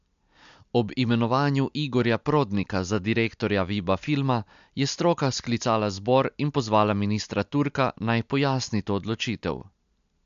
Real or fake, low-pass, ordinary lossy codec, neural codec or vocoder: real; 7.2 kHz; MP3, 64 kbps; none